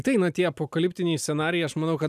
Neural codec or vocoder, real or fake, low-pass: none; real; 14.4 kHz